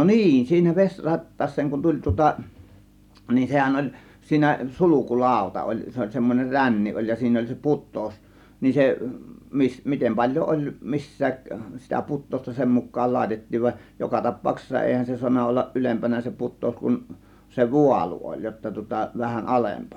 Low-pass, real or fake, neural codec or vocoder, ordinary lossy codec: 19.8 kHz; real; none; none